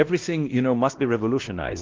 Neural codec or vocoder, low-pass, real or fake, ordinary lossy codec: codec, 16 kHz, 1 kbps, X-Codec, WavLM features, trained on Multilingual LibriSpeech; 7.2 kHz; fake; Opus, 16 kbps